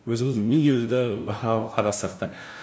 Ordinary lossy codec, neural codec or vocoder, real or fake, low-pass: none; codec, 16 kHz, 0.5 kbps, FunCodec, trained on LibriTTS, 25 frames a second; fake; none